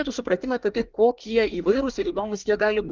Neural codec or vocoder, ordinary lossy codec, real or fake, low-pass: codec, 24 kHz, 1 kbps, SNAC; Opus, 32 kbps; fake; 7.2 kHz